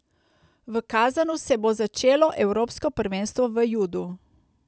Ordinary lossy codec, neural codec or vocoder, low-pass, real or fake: none; none; none; real